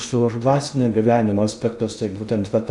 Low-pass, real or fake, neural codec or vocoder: 10.8 kHz; fake; codec, 16 kHz in and 24 kHz out, 0.6 kbps, FocalCodec, streaming, 2048 codes